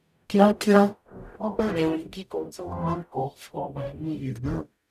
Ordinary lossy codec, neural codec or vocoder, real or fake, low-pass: none; codec, 44.1 kHz, 0.9 kbps, DAC; fake; 14.4 kHz